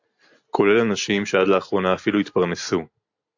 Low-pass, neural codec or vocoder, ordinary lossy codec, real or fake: 7.2 kHz; none; AAC, 48 kbps; real